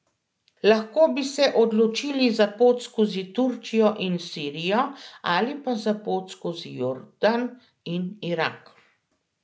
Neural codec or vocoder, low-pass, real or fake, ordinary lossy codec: none; none; real; none